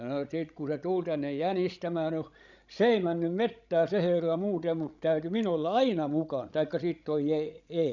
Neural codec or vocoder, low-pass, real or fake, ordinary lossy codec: codec, 16 kHz, 16 kbps, FreqCodec, larger model; 7.2 kHz; fake; none